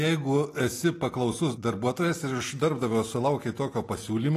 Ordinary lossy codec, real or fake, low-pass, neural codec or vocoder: AAC, 48 kbps; real; 14.4 kHz; none